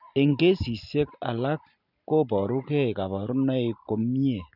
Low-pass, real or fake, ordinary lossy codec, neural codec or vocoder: 5.4 kHz; real; none; none